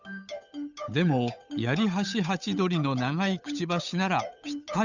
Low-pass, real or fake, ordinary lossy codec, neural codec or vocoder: 7.2 kHz; fake; none; codec, 16 kHz, 8 kbps, FunCodec, trained on Chinese and English, 25 frames a second